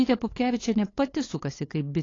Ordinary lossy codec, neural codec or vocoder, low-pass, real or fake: AAC, 32 kbps; codec, 16 kHz, 8 kbps, FunCodec, trained on LibriTTS, 25 frames a second; 7.2 kHz; fake